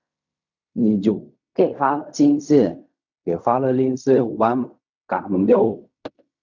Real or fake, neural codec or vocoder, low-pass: fake; codec, 16 kHz in and 24 kHz out, 0.4 kbps, LongCat-Audio-Codec, fine tuned four codebook decoder; 7.2 kHz